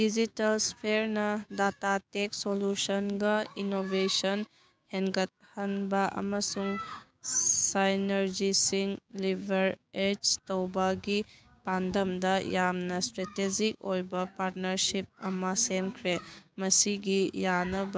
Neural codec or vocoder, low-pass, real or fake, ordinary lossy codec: codec, 16 kHz, 6 kbps, DAC; none; fake; none